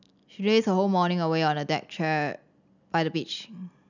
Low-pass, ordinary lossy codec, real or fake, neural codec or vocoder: 7.2 kHz; none; real; none